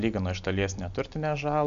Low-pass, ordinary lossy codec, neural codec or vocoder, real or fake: 7.2 kHz; MP3, 64 kbps; none; real